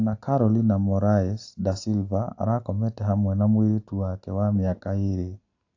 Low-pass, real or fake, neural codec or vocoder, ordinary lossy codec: 7.2 kHz; real; none; AAC, 48 kbps